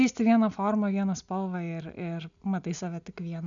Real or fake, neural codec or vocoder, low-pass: real; none; 7.2 kHz